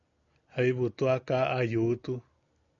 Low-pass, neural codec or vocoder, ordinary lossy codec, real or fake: 7.2 kHz; none; MP3, 96 kbps; real